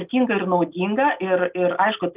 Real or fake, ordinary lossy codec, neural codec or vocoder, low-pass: real; Opus, 24 kbps; none; 3.6 kHz